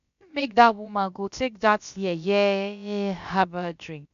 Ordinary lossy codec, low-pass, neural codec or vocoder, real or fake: none; 7.2 kHz; codec, 16 kHz, about 1 kbps, DyCAST, with the encoder's durations; fake